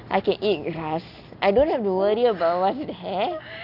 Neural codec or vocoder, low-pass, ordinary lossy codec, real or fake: none; 5.4 kHz; none; real